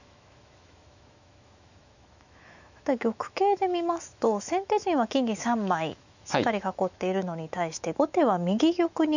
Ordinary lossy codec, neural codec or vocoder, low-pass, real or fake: none; autoencoder, 48 kHz, 128 numbers a frame, DAC-VAE, trained on Japanese speech; 7.2 kHz; fake